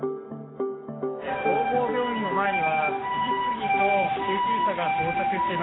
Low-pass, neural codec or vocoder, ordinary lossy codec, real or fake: 7.2 kHz; none; AAC, 16 kbps; real